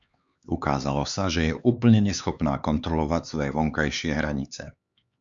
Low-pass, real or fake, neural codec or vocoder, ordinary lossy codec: 7.2 kHz; fake; codec, 16 kHz, 4 kbps, X-Codec, HuBERT features, trained on LibriSpeech; Opus, 64 kbps